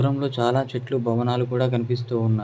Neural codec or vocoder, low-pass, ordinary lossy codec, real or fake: none; none; none; real